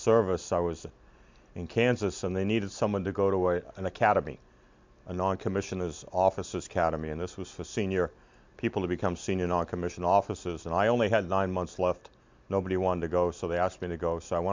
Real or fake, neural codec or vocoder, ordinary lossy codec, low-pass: real; none; MP3, 64 kbps; 7.2 kHz